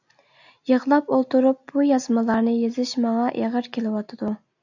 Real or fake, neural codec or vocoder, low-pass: real; none; 7.2 kHz